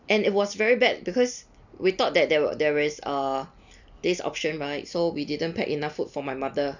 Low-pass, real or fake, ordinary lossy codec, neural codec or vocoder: 7.2 kHz; real; none; none